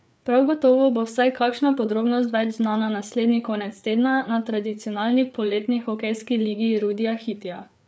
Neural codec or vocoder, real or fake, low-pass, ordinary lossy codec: codec, 16 kHz, 4 kbps, FreqCodec, larger model; fake; none; none